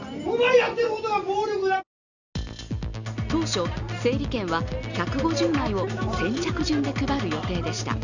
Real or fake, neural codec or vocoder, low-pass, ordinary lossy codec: real; none; 7.2 kHz; none